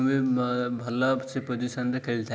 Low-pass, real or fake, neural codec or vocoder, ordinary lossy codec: none; real; none; none